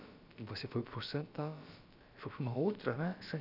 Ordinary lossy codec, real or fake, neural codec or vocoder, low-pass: none; fake; codec, 16 kHz, about 1 kbps, DyCAST, with the encoder's durations; 5.4 kHz